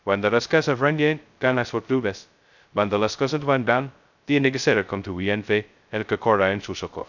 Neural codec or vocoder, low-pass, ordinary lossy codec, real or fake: codec, 16 kHz, 0.2 kbps, FocalCodec; 7.2 kHz; none; fake